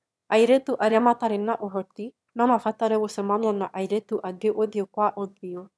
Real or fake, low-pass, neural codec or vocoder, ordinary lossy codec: fake; none; autoencoder, 22.05 kHz, a latent of 192 numbers a frame, VITS, trained on one speaker; none